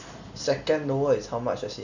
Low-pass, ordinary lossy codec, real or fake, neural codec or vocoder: 7.2 kHz; none; real; none